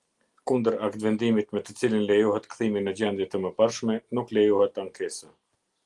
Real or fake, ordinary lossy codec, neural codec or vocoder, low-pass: real; Opus, 24 kbps; none; 10.8 kHz